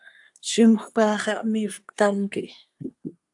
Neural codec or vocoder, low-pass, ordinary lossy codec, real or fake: codec, 24 kHz, 1 kbps, SNAC; 10.8 kHz; AAC, 64 kbps; fake